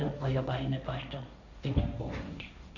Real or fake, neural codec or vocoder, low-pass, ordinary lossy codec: fake; codec, 16 kHz, 1.1 kbps, Voila-Tokenizer; 7.2 kHz; none